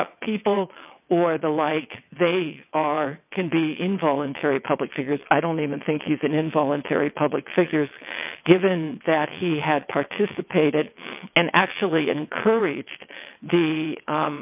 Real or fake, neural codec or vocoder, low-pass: fake; vocoder, 22.05 kHz, 80 mel bands, WaveNeXt; 3.6 kHz